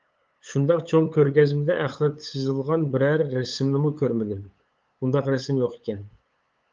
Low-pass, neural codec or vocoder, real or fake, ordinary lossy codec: 7.2 kHz; codec, 16 kHz, 8 kbps, FunCodec, trained on LibriTTS, 25 frames a second; fake; Opus, 24 kbps